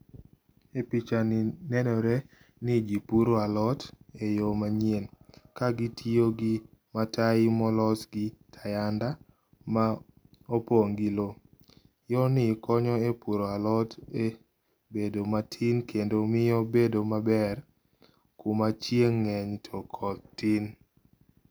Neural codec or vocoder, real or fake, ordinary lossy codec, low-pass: none; real; none; none